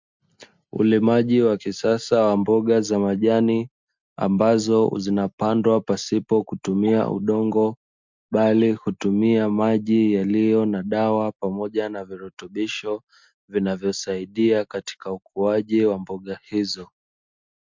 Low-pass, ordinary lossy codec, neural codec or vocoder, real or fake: 7.2 kHz; MP3, 64 kbps; none; real